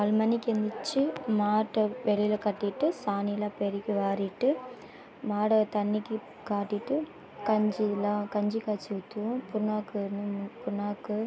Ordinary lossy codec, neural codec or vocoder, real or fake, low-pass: none; none; real; none